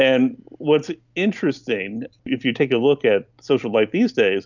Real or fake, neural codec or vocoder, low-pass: real; none; 7.2 kHz